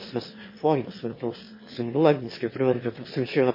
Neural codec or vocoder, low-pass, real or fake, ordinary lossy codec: autoencoder, 22.05 kHz, a latent of 192 numbers a frame, VITS, trained on one speaker; 5.4 kHz; fake; MP3, 24 kbps